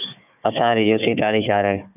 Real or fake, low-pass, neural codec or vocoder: fake; 3.6 kHz; codec, 16 kHz, 4 kbps, FunCodec, trained on Chinese and English, 50 frames a second